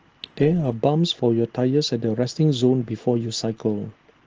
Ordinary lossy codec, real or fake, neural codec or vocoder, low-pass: Opus, 16 kbps; real; none; 7.2 kHz